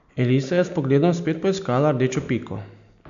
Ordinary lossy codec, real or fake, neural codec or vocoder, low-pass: MP3, 64 kbps; real; none; 7.2 kHz